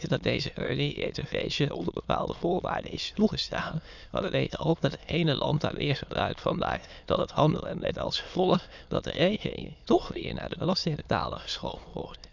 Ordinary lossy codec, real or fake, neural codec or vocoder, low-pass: none; fake; autoencoder, 22.05 kHz, a latent of 192 numbers a frame, VITS, trained on many speakers; 7.2 kHz